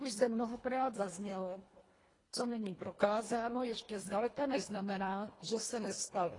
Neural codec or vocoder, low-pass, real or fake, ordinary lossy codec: codec, 24 kHz, 1.5 kbps, HILCodec; 10.8 kHz; fake; AAC, 32 kbps